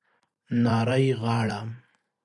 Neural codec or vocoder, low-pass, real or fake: vocoder, 44.1 kHz, 128 mel bands every 512 samples, BigVGAN v2; 10.8 kHz; fake